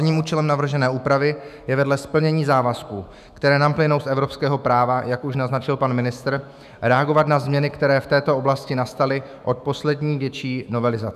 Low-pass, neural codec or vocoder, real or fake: 14.4 kHz; autoencoder, 48 kHz, 128 numbers a frame, DAC-VAE, trained on Japanese speech; fake